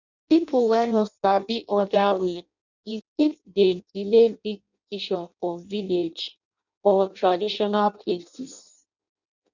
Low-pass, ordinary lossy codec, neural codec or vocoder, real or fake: 7.2 kHz; none; codec, 16 kHz in and 24 kHz out, 0.6 kbps, FireRedTTS-2 codec; fake